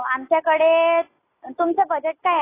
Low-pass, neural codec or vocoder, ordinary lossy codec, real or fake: 3.6 kHz; none; AAC, 16 kbps; real